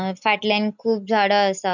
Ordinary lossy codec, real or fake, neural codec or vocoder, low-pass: none; real; none; 7.2 kHz